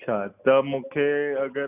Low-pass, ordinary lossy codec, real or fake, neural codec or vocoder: 3.6 kHz; AAC, 32 kbps; real; none